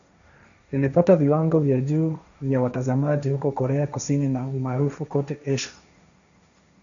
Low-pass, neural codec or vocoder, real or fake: 7.2 kHz; codec, 16 kHz, 1.1 kbps, Voila-Tokenizer; fake